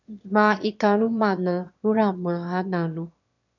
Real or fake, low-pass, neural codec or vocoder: fake; 7.2 kHz; autoencoder, 22.05 kHz, a latent of 192 numbers a frame, VITS, trained on one speaker